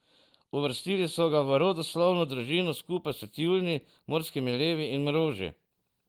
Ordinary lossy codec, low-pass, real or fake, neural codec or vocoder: Opus, 24 kbps; 19.8 kHz; fake; vocoder, 44.1 kHz, 128 mel bands every 512 samples, BigVGAN v2